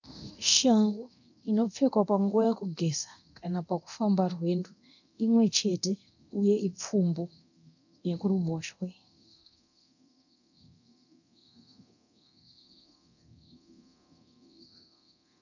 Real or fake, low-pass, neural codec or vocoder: fake; 7.2 kHz; codec, 24 kHz, 0.9 kbps, DualCodec